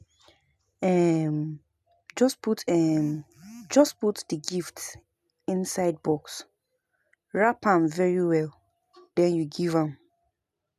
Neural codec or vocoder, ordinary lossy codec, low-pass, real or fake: none; none; 14.4 kHz; real